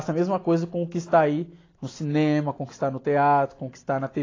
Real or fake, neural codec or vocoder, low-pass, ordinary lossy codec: real; none; 7.2 kHz; AAC, 32 kbps